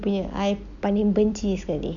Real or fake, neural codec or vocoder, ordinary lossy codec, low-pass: real; none; none; 7.2 kHz